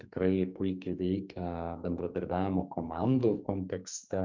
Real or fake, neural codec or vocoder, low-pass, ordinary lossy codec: fake; codec, 44.1 kHz, 2.6 kbps, SNAC; 7.2 kHz; MP3, 64 kbps